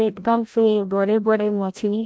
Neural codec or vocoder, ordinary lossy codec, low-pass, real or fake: codec, 16 kHz, 0.5 kbps, FreqCodec, larger model; none; none; fake